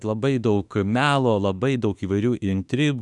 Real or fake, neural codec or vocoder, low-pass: fake; autoencoder, 48 kHz, 32 numbers a frame, DAC-VAE, trained on Japanese speech; 10.8 kHz